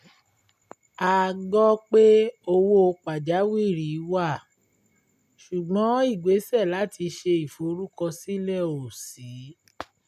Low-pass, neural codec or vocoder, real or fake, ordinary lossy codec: 14.4 kHz; none; real; none